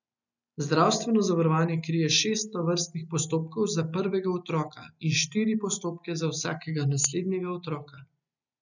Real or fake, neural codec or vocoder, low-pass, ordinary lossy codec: real; none; 7.2 kHz; none